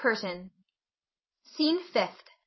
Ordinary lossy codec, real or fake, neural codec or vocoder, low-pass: MP3, 24 kbps; fake; vocoder, 22.05 kHz, 80 mel bands, Vocos; 7.2 kHz